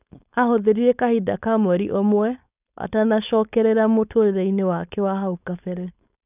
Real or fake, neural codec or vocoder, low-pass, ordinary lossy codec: fake; codec, 16 kHz, 4.8 kbps, FACodec; 3.6 kHz; none